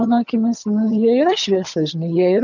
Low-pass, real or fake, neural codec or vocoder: 7.2 kHz; fake; vocoder, 22.05 kHz, 80 mel bands, HiFi-GAN